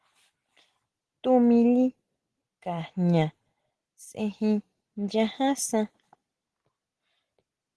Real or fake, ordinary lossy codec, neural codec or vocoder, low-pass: real; Opus, 16 kbps; none; 10.8 kHz